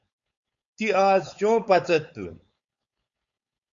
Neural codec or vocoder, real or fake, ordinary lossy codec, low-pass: codec, 16 kHz, 4.8 kbps, FACodec; fake; Opus, 64 kbps; 7.2 kHz